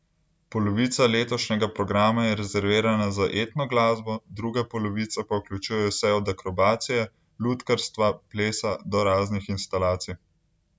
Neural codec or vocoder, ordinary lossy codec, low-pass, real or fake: none; none; none; real